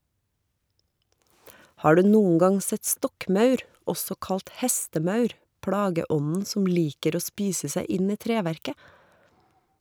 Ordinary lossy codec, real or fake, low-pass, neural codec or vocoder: none; fake; none; vocoder, 44.1 kHz, 128 mel bands every 256 samples, BigVGAN v2